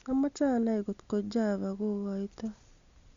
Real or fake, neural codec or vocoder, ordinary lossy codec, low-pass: real; none; Opus, 64 kbps; 7.2 kHz